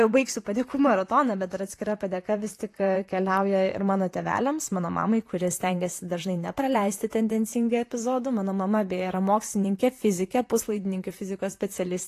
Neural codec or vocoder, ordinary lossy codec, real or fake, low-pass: vocoder, 44.1 kHz, 128 mel bands, Pupu-Vocoder; AAC, 48 kbps; fake; 14.4 kHz